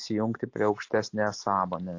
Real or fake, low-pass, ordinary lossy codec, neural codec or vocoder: real; 7.2 kHz; AAC, 48 kbps; none